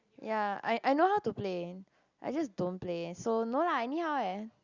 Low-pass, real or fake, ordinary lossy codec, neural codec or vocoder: 7.2 kHz; real; Opus, 64 kbps; none